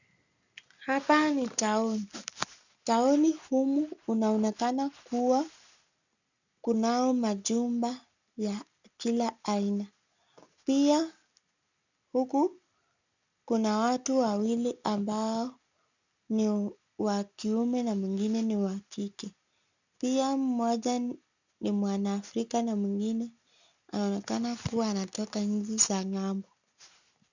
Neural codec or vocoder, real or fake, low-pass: none; real; 7.2 kHz